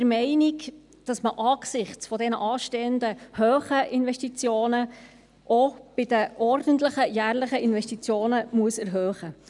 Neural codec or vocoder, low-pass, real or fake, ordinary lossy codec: none; 10.8 kHz; real; none